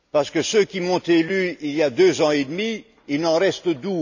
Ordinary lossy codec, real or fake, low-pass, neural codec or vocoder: none; real; 7.2 kHz; none